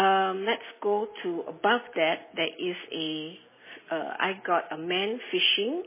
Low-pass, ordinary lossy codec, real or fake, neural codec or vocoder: 3.6 kHz; MP3, 16 kbps; real; none